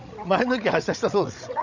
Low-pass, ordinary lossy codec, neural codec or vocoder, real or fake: 7.2 kHz; none; codec, 16 kHz, 16 kbps, FreqCodec, larger model; fake